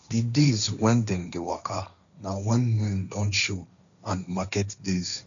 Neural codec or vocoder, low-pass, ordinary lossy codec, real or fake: codec, 16 kHz, 1.1 kbps, Voila-Tokenizer; 7.2 kHz; none; fake